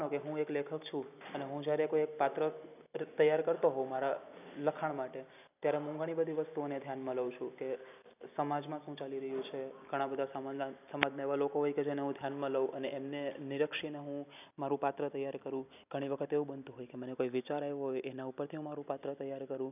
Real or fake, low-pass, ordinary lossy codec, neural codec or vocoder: real; 3.6 kHz; none; none